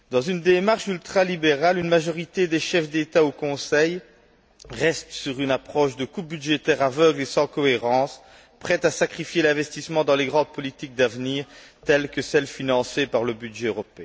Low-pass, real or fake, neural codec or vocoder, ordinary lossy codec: none; real; none; none